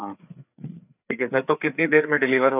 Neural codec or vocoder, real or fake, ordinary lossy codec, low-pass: codec, 16 kHz, 4 kbps, FreqCodec, larger model; fake; none; 3.6 kHz